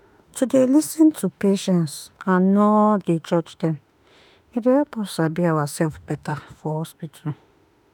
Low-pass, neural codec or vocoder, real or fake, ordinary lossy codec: none; autoencoder, 48 kHz, 32 numbers a frame, DAC-VAE, trained on Japanese speech; fake; none